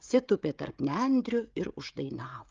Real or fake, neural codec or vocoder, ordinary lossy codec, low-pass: fake; codec, 16 kHz, 16 kbps, FreqCodec, smaller model; Opus, 24 kbps; 7.2 kHz